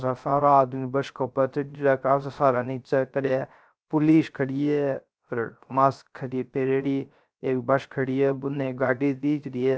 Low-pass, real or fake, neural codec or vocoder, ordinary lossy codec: none; fake; codec, 16 kHz, 0.3 kbps, FocalCodec; none